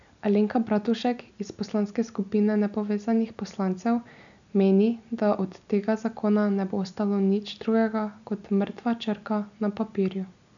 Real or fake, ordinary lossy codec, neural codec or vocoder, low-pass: real; none; none; 7.2 kHz